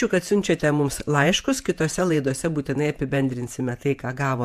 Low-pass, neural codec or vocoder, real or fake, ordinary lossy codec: 14.4 kHz; vocoder, 48 kHz, 128 mel bands, Vocos; fake; AAC, 96 kbps